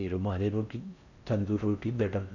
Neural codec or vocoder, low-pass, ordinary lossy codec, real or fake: codec, 16 kHz in and 24 kHz out, 0.6 kbps, FocalCodec, streaming, 4096 codes; 7.2 kHz; none; fake